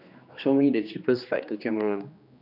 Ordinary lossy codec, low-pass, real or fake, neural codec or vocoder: none; 5.4 kHz; fake; codec, 16 kHz, 2 kbps, X-Codec, HuBERT features, trained on general audio